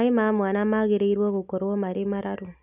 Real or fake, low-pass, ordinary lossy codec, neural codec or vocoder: real; 3.6 kHz; none; none